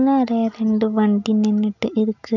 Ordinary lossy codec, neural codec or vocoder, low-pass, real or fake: none; none; 7.2 kHz; real